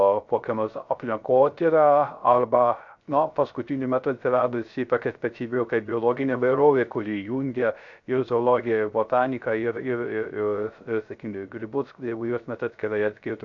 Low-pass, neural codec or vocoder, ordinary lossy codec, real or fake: 7.2 kHz; codec, 16 kHz, 0.3 kbps, FocalCodec; MP3, 96 kbps; fake